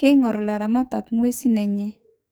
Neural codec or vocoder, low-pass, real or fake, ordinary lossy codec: codec, 44.1 kHz, 2.6 kbps, SNAC; none; fake; none